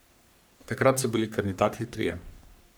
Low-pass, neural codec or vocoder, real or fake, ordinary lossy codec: none; codec, 44.1 kHz, 3.4 kbps, Pupu-Codec; fake; none